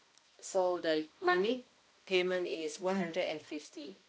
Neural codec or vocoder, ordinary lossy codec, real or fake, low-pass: codec, 16 kHz, 1 kbps, X-Codec, HuBERT features, trained on balanced general audio; none; fake; none